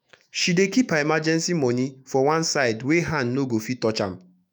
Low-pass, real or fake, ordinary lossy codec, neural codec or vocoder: none; fake; none; autoencoder, 48 kHz, 128 numbers a frame, DAC-VAE, trained on Japanese speech